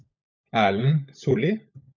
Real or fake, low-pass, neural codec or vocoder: fake; 7.2 kHz; codec, 16 kHz, 16 kbps, FunCodec, trained on LibriTTS, 50 frames a second